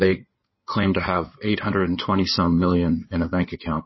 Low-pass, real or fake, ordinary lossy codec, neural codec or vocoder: 7.2 kHz; fake; MP3, 24 kbps; codec, 16 kHz in and 24 kHz out, 2.2 kbps, FireRedTTS-2 codec